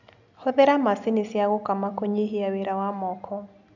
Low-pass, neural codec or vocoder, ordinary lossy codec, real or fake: 7.2 kHz; none; none; real